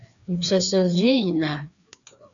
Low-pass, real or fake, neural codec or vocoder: 7.2 kHz; fake; codec, 16 kHz, 2 kbps, FreqCodec, larger model